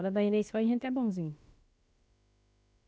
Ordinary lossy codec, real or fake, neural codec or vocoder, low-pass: none; fake; codec, 16 kHz, about 1 kbps, DyCAST, with the encoder's durations; none